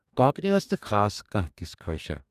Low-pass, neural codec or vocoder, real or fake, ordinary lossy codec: 14.4 kHz; codec, 44.1 kHz, 2.6 kbps, DAC; fake; none